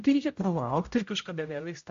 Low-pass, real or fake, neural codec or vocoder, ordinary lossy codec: 7.2 kHz; fake; codec, 16 kHz, 0.5 kbps, X-Codec, HuBERT features, trained on general audio; MP3, 48 kbps